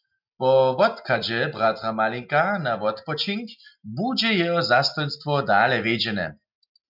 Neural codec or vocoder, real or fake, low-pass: none; real; 5.4 kHz